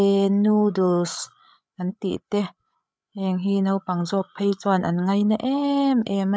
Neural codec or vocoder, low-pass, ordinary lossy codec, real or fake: codec, 16 kHz, 8 kbps, FreqCodec, larger model; none; none; fake